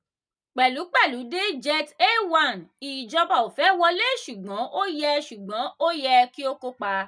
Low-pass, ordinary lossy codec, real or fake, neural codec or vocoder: 9.9 kHz; none; real; none